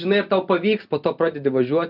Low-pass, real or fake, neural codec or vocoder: 5.4 kHz; real; none